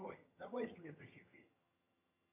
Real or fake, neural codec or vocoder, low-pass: fake; vocoder, 22.05 kHz, 80 mel bands, HiFi-GAN; 3.6 kHz